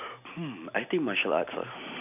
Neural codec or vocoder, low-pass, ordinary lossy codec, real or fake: none; 3.6 kHz; none; real